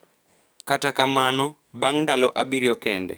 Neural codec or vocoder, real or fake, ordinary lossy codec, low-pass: codec, 44.1 kHz, 2.6 kbps, SNAC; fake; none; none